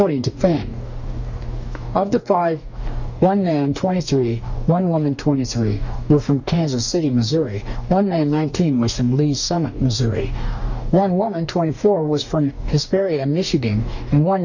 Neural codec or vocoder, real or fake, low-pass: codec, 44.1 kHz, 2.6 kbps, DAC; fake; 7.2 kHz